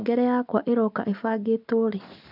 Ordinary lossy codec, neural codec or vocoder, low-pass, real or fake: none; none; 5.4 kHz; real